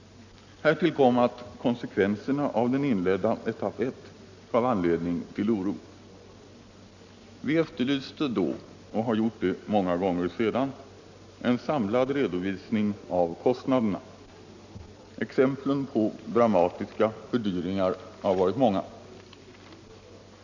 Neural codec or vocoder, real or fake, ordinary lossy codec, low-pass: none; real; none; 7.2 kHz